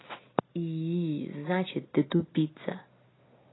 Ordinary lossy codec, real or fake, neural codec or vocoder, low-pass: AAC, 16 kbps; real; none; 7.2 kHz